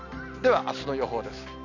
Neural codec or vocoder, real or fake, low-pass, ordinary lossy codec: none; real; 7.2 kHz; none